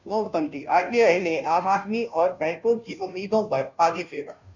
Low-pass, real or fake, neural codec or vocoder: 7.2 kHz; fake; codec, 16 kHz, 0.5 kbps, FunCodec, trained on Chinese and English, 25 frames a second